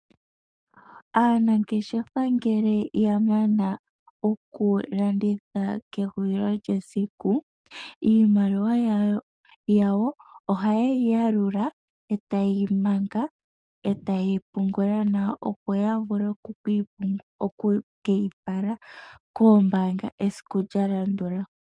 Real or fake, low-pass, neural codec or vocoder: fake; 9.9 kHz; codec, 44.1 kHz, 7.8 kbps, DAC